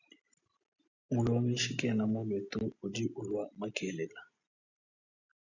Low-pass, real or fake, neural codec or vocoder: 7.2 kHz; real; none